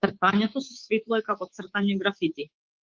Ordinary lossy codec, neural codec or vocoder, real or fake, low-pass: Opus, 16 kbps; codec, 24 kHz, 3.1 kbps, DualCodec; fake; 7.2 kHz